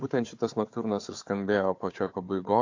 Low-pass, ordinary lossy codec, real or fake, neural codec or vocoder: 7.2 kHz; AAC, 48 kbps; fake; codec, 16 kHz, 4 kbps, FunCodec, trained on Chinese and English, 50 frames a second